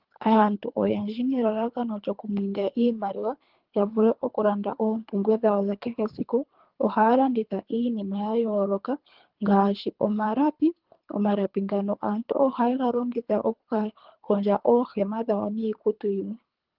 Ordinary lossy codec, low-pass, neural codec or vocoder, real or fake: Opus, 32 kbps; 5.4 kHz; codec, 24 kHz, 3 kbps, HILCodec; fake